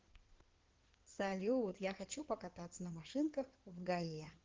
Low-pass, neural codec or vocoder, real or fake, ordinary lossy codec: 7.2 kHz; codec, 16 kHz in and 24 kHz out, 2.2 kbps, FireRedTTS-2 codec; fake; Opus, 16 kbps